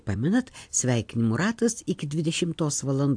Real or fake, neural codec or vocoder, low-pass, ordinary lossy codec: real; none; 9.9 kHz; AAC, 64 kbps